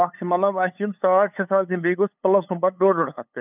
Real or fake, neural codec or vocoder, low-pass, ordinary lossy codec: fake; codec, 16 kHz, 8 kbps, FunCodec, trained on LibriTTS, 25 frames a second; 3.6 kHz; none